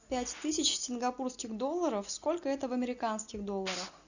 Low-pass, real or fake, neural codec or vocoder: 7.2 kHz; real; none